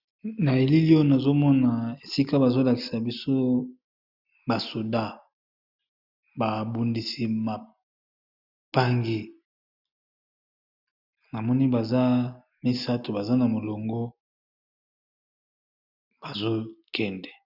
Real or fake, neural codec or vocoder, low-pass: real; none; 5.4 kHz